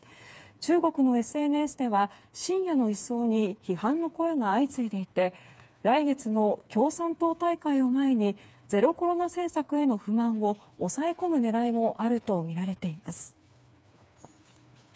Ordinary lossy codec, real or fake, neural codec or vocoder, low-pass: none; fake; codec, 16 kHz, 4 kbps, FreqCodec, smaller model; none